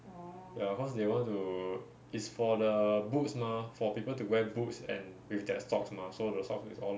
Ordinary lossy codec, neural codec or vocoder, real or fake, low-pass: none; none; real; none